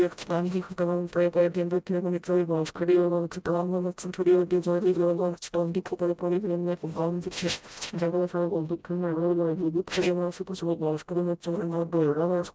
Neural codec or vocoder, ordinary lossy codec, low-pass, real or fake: codec, 16 kHz, 0.5 kbps, FreqCodec, smaller model; none; none; fake